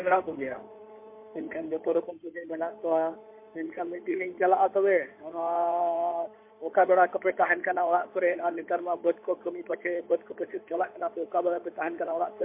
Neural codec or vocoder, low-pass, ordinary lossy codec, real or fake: codec, 16 kHz in and 24 kHz out, 2.2 kbps, FireRedTTS-2 codec; 3.6 kHz; MP3, 24 kbps; fake